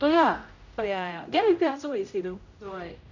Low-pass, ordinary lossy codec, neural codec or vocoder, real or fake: 7.2 kHz; none; codec, 16 kHz, 0.5 kbps, X-Codec, HuBERT features, trained on general audio; fake